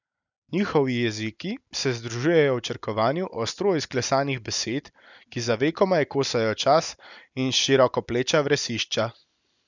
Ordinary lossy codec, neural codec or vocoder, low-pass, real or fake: none; none; 7.2 kHz; real